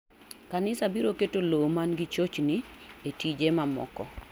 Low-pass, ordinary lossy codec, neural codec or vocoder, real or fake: none; none; none; real